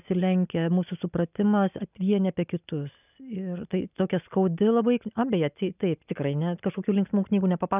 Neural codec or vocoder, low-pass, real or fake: none; 3.6 kHz; real